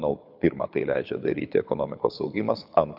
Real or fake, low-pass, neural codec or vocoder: fake; 5.4 kHz; codec, 24 kHz, 6 kbps, HILCodec